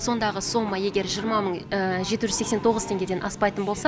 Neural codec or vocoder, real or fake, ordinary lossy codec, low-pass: none; real; none; none